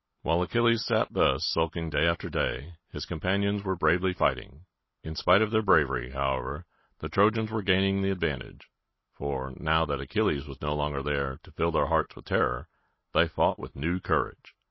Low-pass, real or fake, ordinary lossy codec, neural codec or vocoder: 7.2 kHz; real; MP3, 24 kbps; none